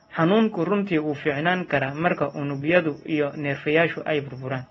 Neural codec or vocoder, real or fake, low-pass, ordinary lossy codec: none; real; 19.8 kHz; AAC, 24 kbps